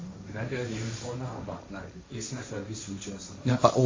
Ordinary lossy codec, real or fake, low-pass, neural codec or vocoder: MP3, 32 kbps; fake; 7.2 kHz; codec, 16 kHz, 1.1 kbps, Voila-Tokenizer